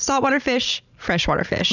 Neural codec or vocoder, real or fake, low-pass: none; real; 7.2 kHz